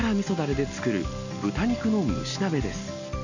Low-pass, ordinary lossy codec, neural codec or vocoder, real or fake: 7.2 kHz; none; none; real